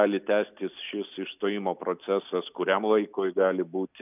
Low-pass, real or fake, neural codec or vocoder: 3.6 kHz; real; none